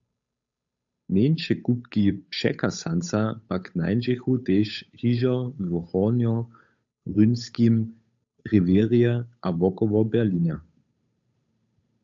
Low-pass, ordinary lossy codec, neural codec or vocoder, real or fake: 7.2 kHz; AAC, 64 kbps; codec, 16 kHz, 8 kbps, FunCodec, trained on Chinese and English, 25 frames a second; fake